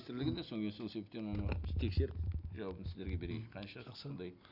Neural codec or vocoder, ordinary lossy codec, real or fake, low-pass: none; none; real; 5.4 kHz